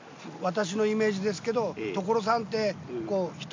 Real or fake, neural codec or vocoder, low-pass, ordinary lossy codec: real; none; 7.2 kHz; MP3, 64 kbps